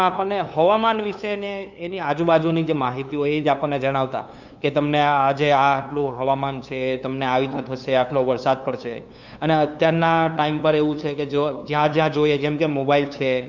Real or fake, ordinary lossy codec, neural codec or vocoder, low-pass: fake; none; codec, 16 kHz, 2 kbps, FunCodec, trained on LibriTTS, 25 frames a second; 7.2 kHz